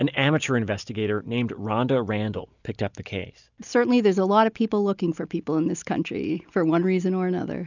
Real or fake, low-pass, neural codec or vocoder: real; 7.2 kHz; none